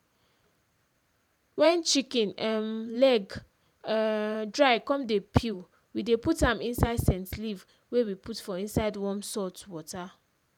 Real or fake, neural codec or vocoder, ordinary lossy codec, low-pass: fake; vocoder, 44.1 kHz, 128 mel bands every 512 samples, BigVGAN v2; none; 19.8 kHz